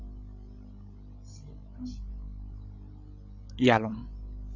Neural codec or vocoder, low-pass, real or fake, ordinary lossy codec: codec, 16 kHz, 16 kbps, FreqCodec, larger model; 7.2 kHz; fake; Opus, 64 kbps